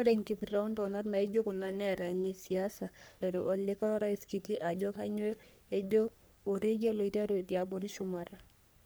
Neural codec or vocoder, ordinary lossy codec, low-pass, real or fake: codec, 44.1 kHz, 3.4 kbps, Pupu-Codec; none; none; fake